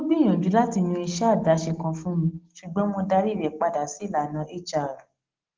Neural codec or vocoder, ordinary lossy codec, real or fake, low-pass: none; Opus, 16 kbps; real; 7.2 kHz